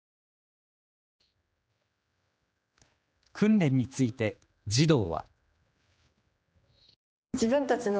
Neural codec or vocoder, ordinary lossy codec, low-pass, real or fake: codec, 16 kHz, 2 kbps, X-Codec, HuBERT features, trained on general audio; none; none; fake